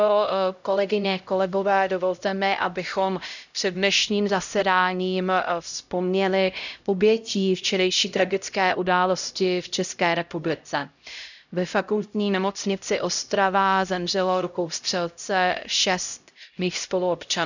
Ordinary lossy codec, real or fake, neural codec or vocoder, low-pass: none; fake; codec, 16 kHz, 0.5 kbps, X-Codec, HuBERT features, trained on LibriSpeech; 7.2 kHz